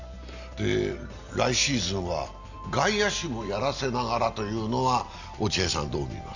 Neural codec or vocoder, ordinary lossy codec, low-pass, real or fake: none; none; 7.2 kHz; real